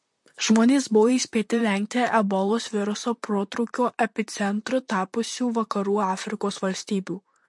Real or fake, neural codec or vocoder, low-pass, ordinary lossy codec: fake; vocoder, 44.1 kHz, 128 mel bands, Pupu-Vocoder; 10.8 kHz; MP3, 48 kbps